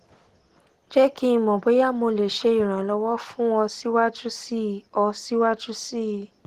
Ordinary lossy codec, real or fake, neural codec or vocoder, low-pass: Opus, 16 kbps; real; none; 14.4 kHz